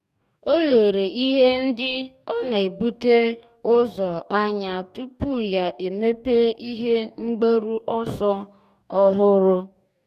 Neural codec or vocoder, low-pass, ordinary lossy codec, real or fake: codec, 44.1 kHz, 2.6 kbps, DAC; 14.4 kHz; none; fake